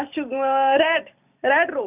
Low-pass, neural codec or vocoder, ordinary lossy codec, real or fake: 3.6 kHz; vocoder, 44.1 kHz, 128 mel bands every 256 samples, BigVGAN v2; none; fake